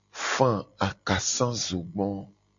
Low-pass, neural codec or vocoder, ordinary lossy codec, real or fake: 7.2 kHz; none; AAC, 64 kbps; real